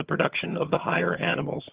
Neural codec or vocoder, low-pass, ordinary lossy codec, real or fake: vocoder, 22.05 kHz, 80 mel bands, HiFi-GAN; 3.6 kHz; Opus, 16 kbps; fake